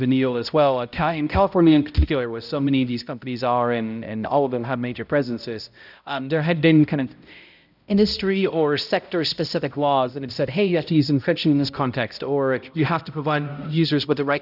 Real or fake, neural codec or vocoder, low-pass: fake; codec, 16 kHz, 0.5 kbps, X-Codec, HuBERT features, trained on balanced general audio; 5.4 kHz